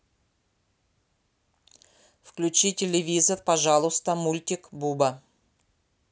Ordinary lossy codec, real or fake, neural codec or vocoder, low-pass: none; real; none; none